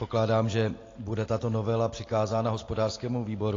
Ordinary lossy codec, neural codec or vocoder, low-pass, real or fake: AAC, 32 kbps; none; 7.2 kHz; real